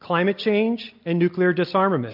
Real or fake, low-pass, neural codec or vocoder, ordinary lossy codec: real; 5.4 kHz; none; MP3, 48 kbps